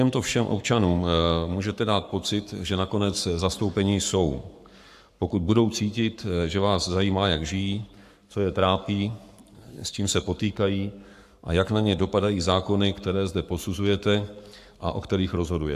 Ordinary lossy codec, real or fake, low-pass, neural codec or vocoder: AAC, 96 kbps; fake; 14.4 kHz; codec, 44.1 kHz, 7.8 kbps, Pupu-Codec